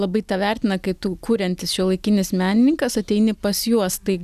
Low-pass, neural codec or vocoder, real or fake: 14.4 kHz; none; real